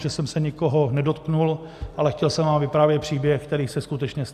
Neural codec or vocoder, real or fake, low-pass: none; real; 14.4 kHz